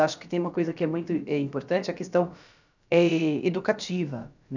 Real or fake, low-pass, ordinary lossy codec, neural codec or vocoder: fake; 7.2 kHz; none; codec, 16 kHz, about 1 kbps, DyCAST, with the encoder's durations